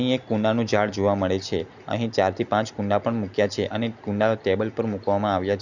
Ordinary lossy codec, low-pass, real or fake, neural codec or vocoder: none; 7.2 kHz; real; none